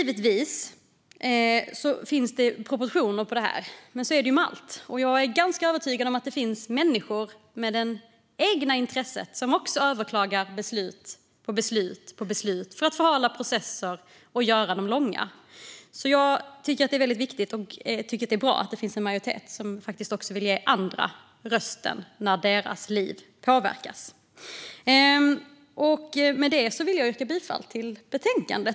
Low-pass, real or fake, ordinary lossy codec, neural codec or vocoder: none; real; none; none